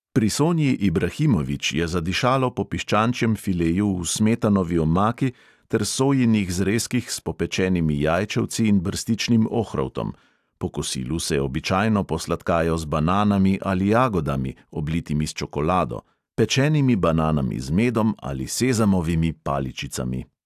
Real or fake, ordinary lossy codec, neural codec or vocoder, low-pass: real; AAC, 96 kbps; none; 14.4 kHz